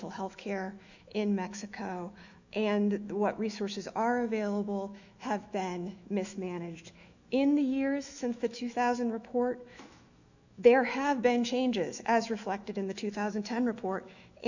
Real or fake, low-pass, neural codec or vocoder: fake; 7.2 kHz; autoencoder, 48 kHz, 128 numbers a frame, DAC-VAE, trained on Japanese speech